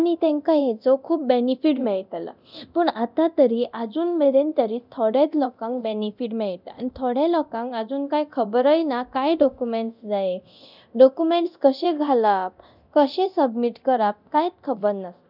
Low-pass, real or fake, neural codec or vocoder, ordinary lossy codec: 5.4 kHz; fake; codec, 24 kHz, 0.9 kbps, DualCodec; none